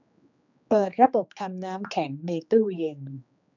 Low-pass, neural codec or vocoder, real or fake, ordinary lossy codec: 7.2 kHz; codec, 16 kHz, 2 kbps, X-Codec, HuBERT features, trained on general audio; fake; none